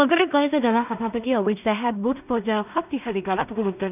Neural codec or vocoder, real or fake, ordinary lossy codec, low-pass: codec, 16 kHz in and 24 kHz out, 0.4 kbps, LongCat-Audio-Codec, two codebook decoder; fake; none; 3.6 kHz